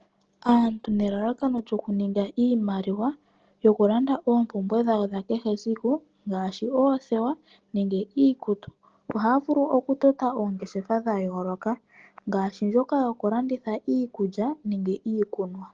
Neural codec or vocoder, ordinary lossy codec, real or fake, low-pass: none; Opus, 16 kbps; real; 7.2 kHz